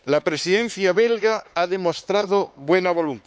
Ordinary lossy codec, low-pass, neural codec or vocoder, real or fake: none; none; codec, 16 kHz, 4 kbps, X-Codec, HuBERT features, trained on LibriSpeech; fake